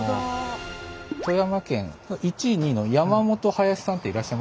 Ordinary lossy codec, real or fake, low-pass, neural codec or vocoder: none; real; none; none